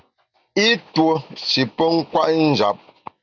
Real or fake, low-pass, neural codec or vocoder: real; 7.2 kHz; none